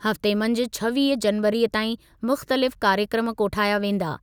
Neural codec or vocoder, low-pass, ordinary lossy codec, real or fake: none; none; none; real